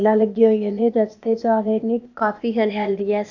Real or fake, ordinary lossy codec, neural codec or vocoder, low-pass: fake; none; codec, 16 kHz, 0.8 kbps, ZipCodec; 7.2 kHz